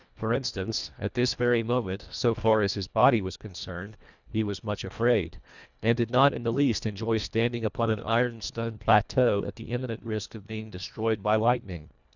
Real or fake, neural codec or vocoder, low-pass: fake; codec, 24 kHz, 1.5 kbps, HILCodec; 7.2 kHz